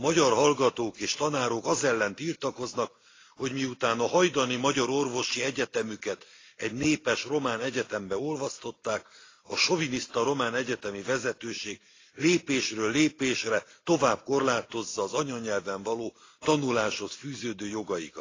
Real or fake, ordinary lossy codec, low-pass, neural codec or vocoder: real; AAC, 32 kbps; 7.2 kHz; none